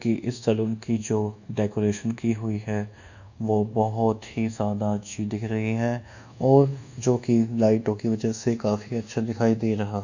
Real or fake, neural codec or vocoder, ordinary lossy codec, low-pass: fake; codec, 24 kHz, 1.2 kbps, DualCodec; none; 7.2 kHz